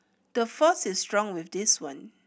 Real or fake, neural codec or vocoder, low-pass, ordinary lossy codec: real; none; none; none